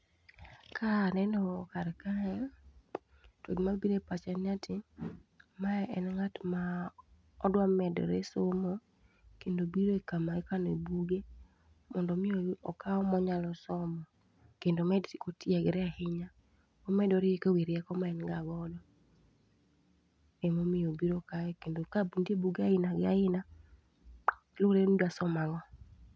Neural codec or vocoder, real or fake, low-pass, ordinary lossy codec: none; real; none; none